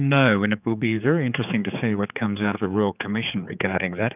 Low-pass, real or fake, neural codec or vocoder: 3.6 kHz; fake; codec, 16 kHz, 4 kbps, X-Codec, HuBERT features, trained on general audio